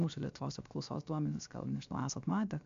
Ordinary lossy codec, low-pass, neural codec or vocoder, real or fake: AAC, 64 kbps; 7.2 kHz; codec, 16 kHz, 0.7 kbps, FocalCodec; fake